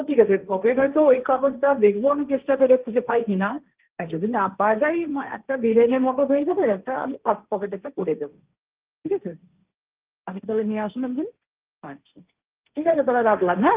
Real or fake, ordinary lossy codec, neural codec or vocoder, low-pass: fake; Opus, 32 kbps; codec, 16 kHz, 1.1 kbps, Voila-Tokenizer; 3.6 kHz